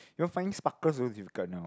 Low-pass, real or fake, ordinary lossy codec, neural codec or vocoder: none; real; none; none